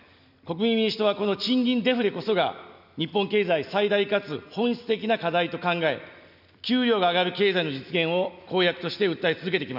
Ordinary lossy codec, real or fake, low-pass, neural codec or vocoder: none; real; 5.4 kHz; none